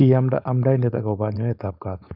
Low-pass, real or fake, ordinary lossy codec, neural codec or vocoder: 5.4 kHz; fake; none; codec, 16 kHz, 4.8 kbps, FACodec